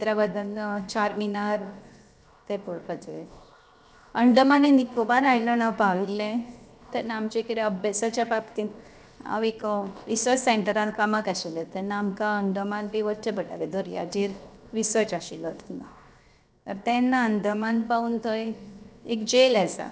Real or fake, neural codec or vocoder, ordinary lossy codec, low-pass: fake; codec, 16 kHz, 0.7 kbps, FocalCodec; none; none